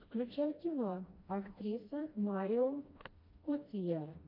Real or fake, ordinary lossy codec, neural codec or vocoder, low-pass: fake; MP3, 32 kbps; codec, 16 kHz, 1 kbps, FreqCodec, smaller model; 5.4 kHz